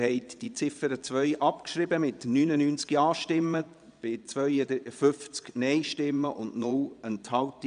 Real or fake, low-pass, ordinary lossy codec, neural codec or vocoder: fake; 9.9 kHz; none; vocoder, 22.05 kHz, 80 mel bands, Vocos